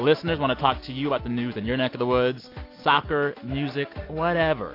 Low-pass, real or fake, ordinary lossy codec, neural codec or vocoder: 5.4 kHz; real; AAC, 32 kbps; none